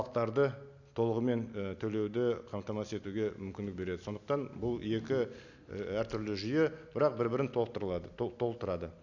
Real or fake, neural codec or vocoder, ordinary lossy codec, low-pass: real; none; none; 7.2 kHz